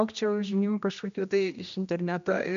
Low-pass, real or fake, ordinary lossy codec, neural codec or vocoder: 7.2 kHz; fake; MP3, 64 kbps; codec, 16 kHz, 1 kbps, X-Codec, HuBERT features, trained on general audio